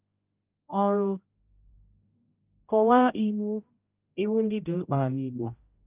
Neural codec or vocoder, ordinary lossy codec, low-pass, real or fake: codec, 16 kHz, 0.5 kbps, X-Codec, HuBERT features, trained on general audio; Opus, 24 kbps; 3.6 kHz; fake